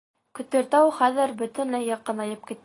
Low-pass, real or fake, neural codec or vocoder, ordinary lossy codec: 10.8 kHz; real; none; AAC, 32 kbps